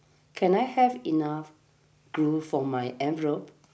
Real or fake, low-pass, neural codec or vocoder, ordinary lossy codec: real; none; none; none